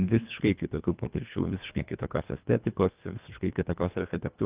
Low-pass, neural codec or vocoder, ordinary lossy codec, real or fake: 3.6 kHz; codec, 24 kHz, 1.5 kbps, HILCodec; Opus, 24 kbps; fake